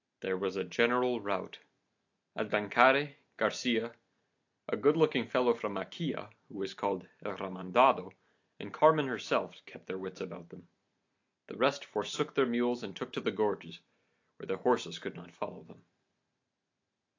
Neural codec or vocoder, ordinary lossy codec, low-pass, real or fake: none; AAC, 48 kbps; 7.2 kHz; real